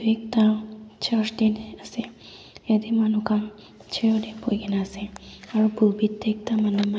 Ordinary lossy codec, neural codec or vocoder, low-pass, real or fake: none; none; none; real